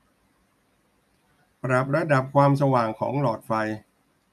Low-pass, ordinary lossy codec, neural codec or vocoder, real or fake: 14.4 kHz; none; none; real